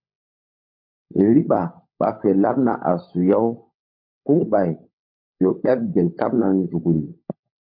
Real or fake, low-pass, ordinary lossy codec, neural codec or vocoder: fake; 5.4 kHz; MP3, 32 kbps; codec, 16 kHz, 16 kbps, FunCodec, trained on LibriTTS, 50 frames a second